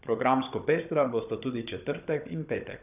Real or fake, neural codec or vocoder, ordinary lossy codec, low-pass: fake; codec, 16 kHz, 16 kbps, FunCodec, trained on Chinese and English, 50 frames a second; none; 3.6 kHz